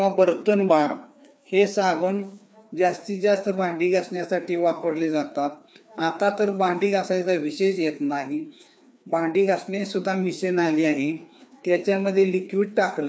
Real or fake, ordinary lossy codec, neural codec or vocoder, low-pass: fake; none; codec, 16 kHz, 2 kbps, FreqCodec, larger model; none